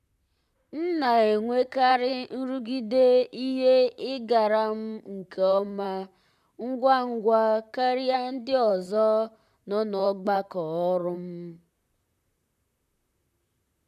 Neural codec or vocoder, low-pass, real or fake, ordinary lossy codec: vocoder, 44.1 kHz, 128 mel bands, Pupu-Vocoder; 14.4 kHz; fake; none